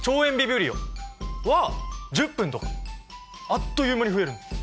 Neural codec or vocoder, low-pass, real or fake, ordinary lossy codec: none; none; real; none